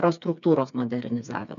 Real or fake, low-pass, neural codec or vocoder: fake; 7.2 kHz; codec, 16 kHz, 4 kbps, FreqCodec, smaller model